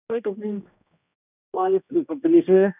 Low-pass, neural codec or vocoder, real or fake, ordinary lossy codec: 3.6 kHz; codec, 16 kHz, 1 kbps, X-Codec, HuBERT features, trained on general audio; fake; none